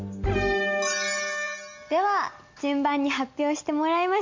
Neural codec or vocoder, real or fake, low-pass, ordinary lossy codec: none; real; 7.2 kHz; MP3, 48 kbps